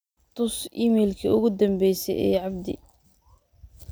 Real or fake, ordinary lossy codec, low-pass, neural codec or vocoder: real; none; none; none